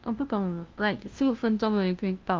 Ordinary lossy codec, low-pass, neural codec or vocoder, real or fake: Opus, 24 kbps; 7.2 kHz; codec, 16 kHz, 0.5 kbps, FunCodec, trained on LibriTTS, 25 frames a second; fake